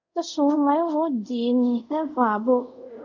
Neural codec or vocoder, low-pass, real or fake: codec, 24 kHz, 0.5 kbps, DualCodec; 7.2 kHz; fake